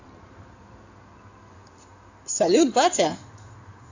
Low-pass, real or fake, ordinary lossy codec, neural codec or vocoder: 7.2 kHz; fake; AAC, 48 kbps; codec, 16 kHz in and 24 kHz out, 2.2 kbps, FireRedTTS-2 codec